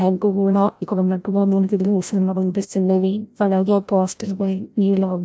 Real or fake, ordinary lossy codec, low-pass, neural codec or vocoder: fake; none; none; codec, 16 kHz, 0.5 kbps, FreqCodec, larger model